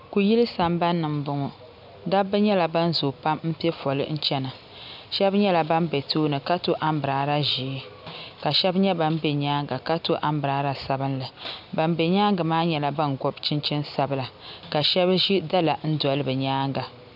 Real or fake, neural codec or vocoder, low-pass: real; none; 5.4 kHz